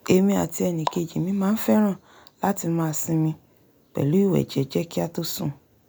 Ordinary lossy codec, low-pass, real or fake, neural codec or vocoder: none; none; real; none